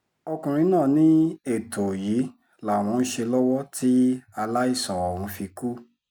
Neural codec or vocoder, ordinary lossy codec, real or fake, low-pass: none; none; real; 19.8 kHz